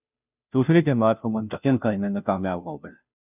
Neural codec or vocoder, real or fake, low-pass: codec, 16 kHz, 0.5 kbps, FunCodec, trained on Chinese and English, 25 frames a second; fake; 3.6 kHz